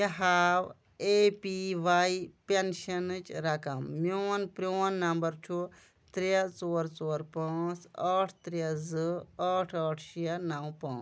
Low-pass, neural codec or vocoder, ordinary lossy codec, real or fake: none; none; none; real